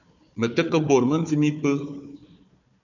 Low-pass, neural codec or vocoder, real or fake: 7.2 kHz; codec, 16 kHz, 4 kbps, FunCodec, trained on Chinese and English, 50 frames a second; fake